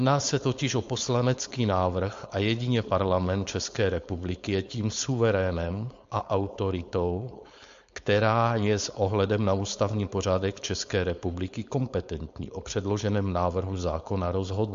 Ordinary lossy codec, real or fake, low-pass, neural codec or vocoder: MP3, 48 kbps; fake; 7.2 kHz; codec, 16 kHz, 4.8 kbps, FACodec